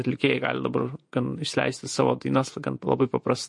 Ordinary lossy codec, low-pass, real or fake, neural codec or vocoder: MP3, 48 kbps; 10.8 kHz; fake; vocoder, 48 kHz, 128 mel bands, Vocos